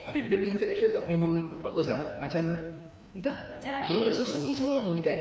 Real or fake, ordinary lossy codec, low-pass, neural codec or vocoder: fake; none; none; codec, 16 kHz, 1 kbps, FreqCodec, larger model